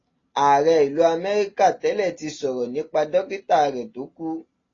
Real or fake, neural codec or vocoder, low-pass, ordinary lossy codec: real; none; 7.2 kHz; AAC, 32 kbps